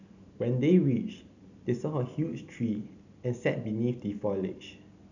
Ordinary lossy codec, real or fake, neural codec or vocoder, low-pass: none; real; none; 7.2 kHz